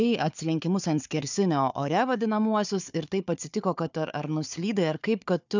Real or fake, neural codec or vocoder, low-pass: fake; codec, 16 kHz, 4 kbps, FunCodec, trained on Chinese and English, 50 frames a second; 7.2 kHz